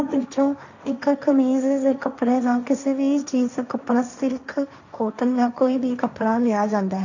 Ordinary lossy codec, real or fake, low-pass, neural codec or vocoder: none; fake; 7.2 kHz; codec, 16 kHz, 1.1 kbps, Voila-Tokenizer